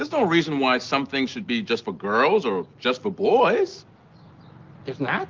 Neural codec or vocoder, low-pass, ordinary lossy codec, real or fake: none; 7.2 kHz; Opus, 32 kbps; real